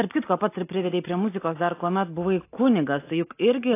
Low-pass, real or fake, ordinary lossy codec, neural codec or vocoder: 3.6 kHz; real; AAC, 24 kbps; none